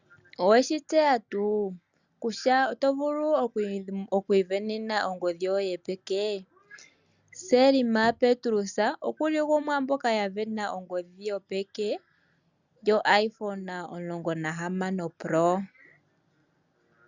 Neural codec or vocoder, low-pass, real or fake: none; 7.2 kHz; real